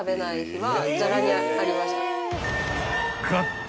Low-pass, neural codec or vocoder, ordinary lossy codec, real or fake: none; none; none; real